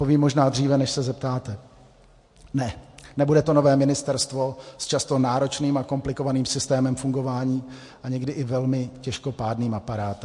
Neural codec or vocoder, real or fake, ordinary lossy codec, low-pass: none; real; MP3, 48 kbps; 10.8 kHz